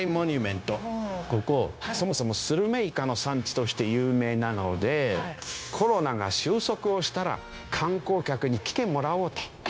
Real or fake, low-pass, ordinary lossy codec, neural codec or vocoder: fake; none; none; codec, 16 kHz, 0.9 kbps, LongCat-Audio-Codec